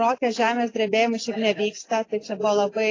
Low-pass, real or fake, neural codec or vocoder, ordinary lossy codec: 7.2 kHz; real; none; AAC, 32 kbps